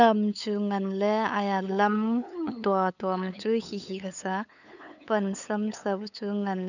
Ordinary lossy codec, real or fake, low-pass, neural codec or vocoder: none; fake; 7.2 kHz; codec, 16 kHz, 8 kbps, FunCodec, trained on LibriTTS, 25 frames a second